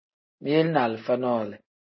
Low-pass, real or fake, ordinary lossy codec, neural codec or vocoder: 7.2 kHz; real; MP3, 24 kbps; none